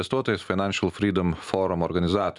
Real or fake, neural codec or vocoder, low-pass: real; none; 10.8 kHz